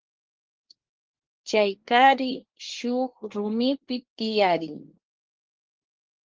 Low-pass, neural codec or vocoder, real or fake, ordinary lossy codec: 7.2 kHz; codec, 24 kHz, 1 kbps, SNAC; fake; Opus, 16 kbps